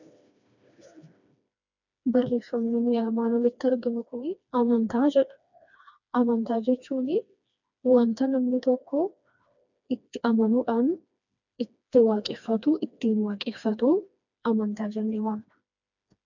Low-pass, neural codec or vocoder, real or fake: 7.2 kHz; codec, 16 kHz, 2 kbps, FreqCodec, smaller model; fake